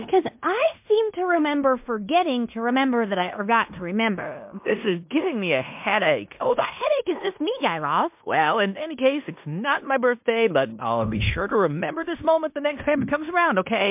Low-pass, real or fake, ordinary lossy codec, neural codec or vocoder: 3.6 kHz; fake; MP3, 32 kbps; codec, 16 kHz in and 24 kHz out, 0.9 kbps, LongCat-Audio-Codec, fine tuned four codebook decoder